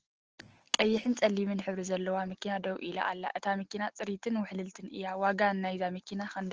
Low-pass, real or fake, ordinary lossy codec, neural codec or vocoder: 7.2 kHz; real; Opus, 16 kbps; none